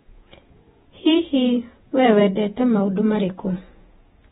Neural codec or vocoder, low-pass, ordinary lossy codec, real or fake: vocoder, 48 kHz, 128 mel bands, Vocos; 19.8 kHz; AAC, 16 kbps; fake